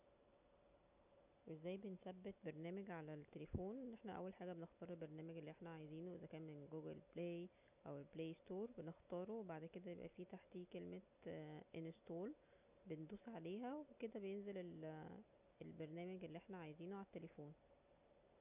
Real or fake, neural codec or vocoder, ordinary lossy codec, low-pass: real; none; none; 3.6 kHz